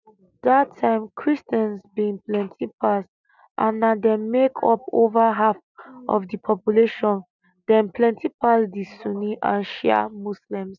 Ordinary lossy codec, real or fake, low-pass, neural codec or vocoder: none; real; 7.2 kHz; none